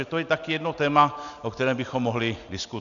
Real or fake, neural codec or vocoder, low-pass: real; none; 7.2 kHz